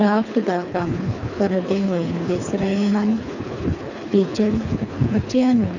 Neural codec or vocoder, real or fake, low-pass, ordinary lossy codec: codec, 24 kHz, 3 kbps, HILCodec; fake; 7.2 kHz; none